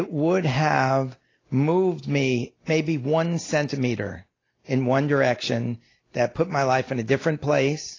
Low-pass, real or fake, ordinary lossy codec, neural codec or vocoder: 7.2 kHz; real; AAC, 32 kbps; none